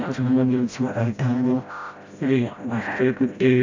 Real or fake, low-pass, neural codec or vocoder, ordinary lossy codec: fake; 7.2 kHz; codec, 16 kHz, 0.5 kbps, FreqCodec, smaller model; AAC, 48 kbps